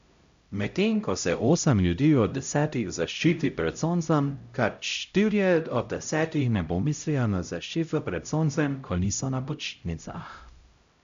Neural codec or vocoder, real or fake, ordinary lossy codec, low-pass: codec, 16 kHz, 0.5 kbps, X-Codec, HuBERT features, trained on LibriSpeech; fake; MP3, 64 kbps; 7.2 kHz